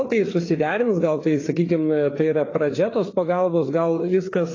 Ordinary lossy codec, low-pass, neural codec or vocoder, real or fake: AAC, 32 kbps; 7.2 kHz; codec, 16 kHz, 4 kbps, FreqCodec, larger model; fake